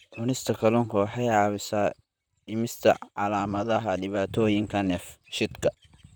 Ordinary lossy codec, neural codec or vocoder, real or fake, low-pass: none; vocoder, 44.1 kHz, 128 mel bands, Pupu-Vocoder; fake; none